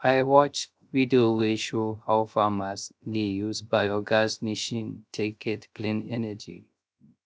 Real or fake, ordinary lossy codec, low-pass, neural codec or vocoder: fake; none; none; codec, 16 kHz, 0.3 kbps, FocalCodec